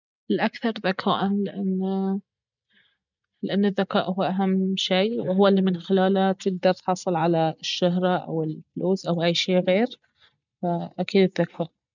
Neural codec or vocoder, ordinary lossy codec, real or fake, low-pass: none; none; real; 7.2 kHz